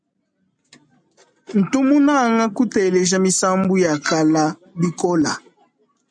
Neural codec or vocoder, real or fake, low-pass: none; real; 9.9 kHz